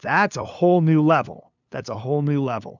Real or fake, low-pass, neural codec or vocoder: fake; 7.2 kHz; codec, 16 kHz, 2 kbps, FunCodec, trained on LibriTTS, 25 frames a second